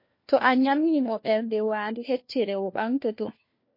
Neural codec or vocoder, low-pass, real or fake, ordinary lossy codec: codec, 16 kHz, 1 kbps, FunCodec, trained on LibriTTS, 50 frames a second; 5.4 kHz; fake; MP3, 32 kbps